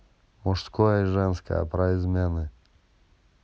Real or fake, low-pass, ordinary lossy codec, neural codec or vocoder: real; none; none; none